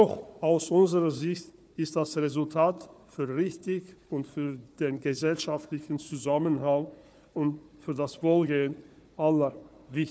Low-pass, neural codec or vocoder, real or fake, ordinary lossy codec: none; codec, 16 kHz, 4 kbps, FunCodec, trained on Chinese and English, 50 frames a second; fake; none